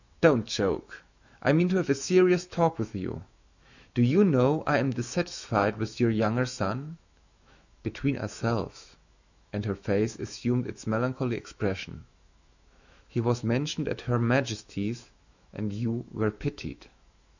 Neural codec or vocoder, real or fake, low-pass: autoencoder, 48 kHz, 128 numbers a frame, DAC-VAE, trained on Japanese speech; fake; 7.2 kHz